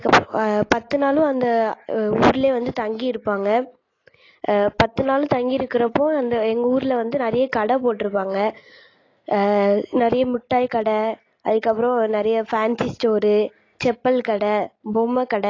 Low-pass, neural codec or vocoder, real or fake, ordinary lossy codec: 7.2 kHz; none; real; AAC, 32 kbps